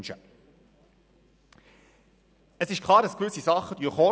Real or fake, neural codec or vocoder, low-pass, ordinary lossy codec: real; none; none; none